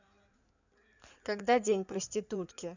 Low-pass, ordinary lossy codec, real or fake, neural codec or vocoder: 7.2 kHz; none; fake; codec, 16 kHz in and 24 kHz out, 2.2 kbps, FireRedTTS-2 codec